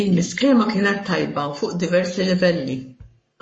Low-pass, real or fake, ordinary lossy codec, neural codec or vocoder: 9.9 kHz; fake; MP3, 32 kbps; codec, 44.1 kHz, 7.8 kbps, Pupu-Codec